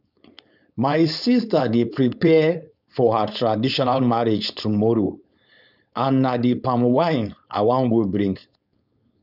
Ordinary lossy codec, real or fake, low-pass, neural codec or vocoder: none; fake; 5.4 kHz; codec, 16 kHz, 4.8 kbps, FACodec